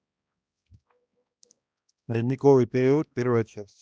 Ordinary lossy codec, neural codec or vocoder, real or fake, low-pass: none; codec, 16 kHz, 1 kbps, X-Codec, HuBERT features, trained on balanced general audio; fake; none